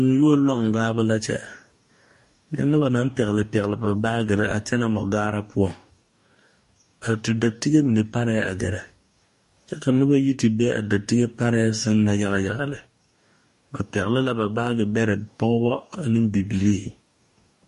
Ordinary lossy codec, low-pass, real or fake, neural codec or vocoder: MP3, 48 kbps; 14.4 kHz; fake; codec, 44.1 kHz, 2.6 kbps, DAC